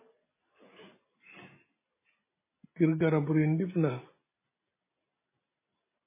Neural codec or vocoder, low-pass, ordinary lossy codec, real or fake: none; 3.6 kHz; MP3, 16 kbps; real